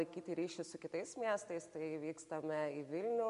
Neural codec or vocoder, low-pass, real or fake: none; 10.8 kHz; real